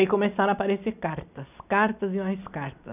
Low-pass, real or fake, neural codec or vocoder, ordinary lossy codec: 3.6 kHz; real; none; none